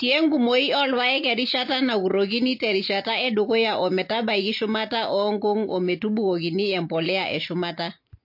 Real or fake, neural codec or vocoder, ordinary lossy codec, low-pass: real; none; MP3, 32 kbps; 5.4 kHz